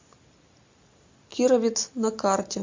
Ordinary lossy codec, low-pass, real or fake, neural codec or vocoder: MP3, 48 kbps; 7.2 kHz; real; none